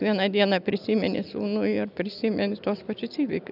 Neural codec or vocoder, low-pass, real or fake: none; 5.4 kHz; real